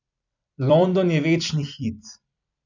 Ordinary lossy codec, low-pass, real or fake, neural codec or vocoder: none; 7.2 kHz; fake; vocoder, 44.1 kHz, 128 mel bands every 256 samples, BigVGAN v2